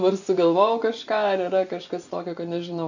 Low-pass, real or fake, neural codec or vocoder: 7.2 kHz; fake; vocoder, 24 kHz, 100 mel bands, Vocos